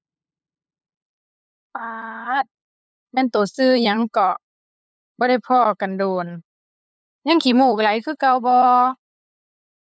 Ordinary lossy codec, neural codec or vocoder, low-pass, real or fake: none; codec, 16 kHz, 8 kbps, FunCodec, trained on LibriTTS, 25 frames a second; none; fake